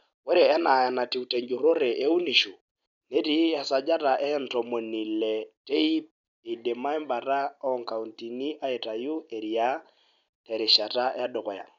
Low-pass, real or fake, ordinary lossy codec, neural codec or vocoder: 7.2 kHz; real; none; none